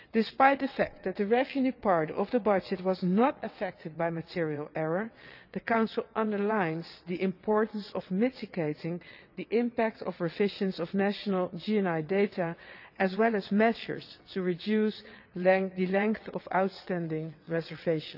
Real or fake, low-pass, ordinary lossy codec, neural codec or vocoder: fake; 5.4 kHz; none; vocoder, 22.05 kHz, 80 mel bands, WaveNeXt